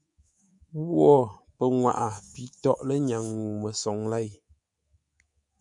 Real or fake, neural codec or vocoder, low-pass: fake; codec, 24 kHz, 3.1 kbps, DualCodec; 10.8 kHz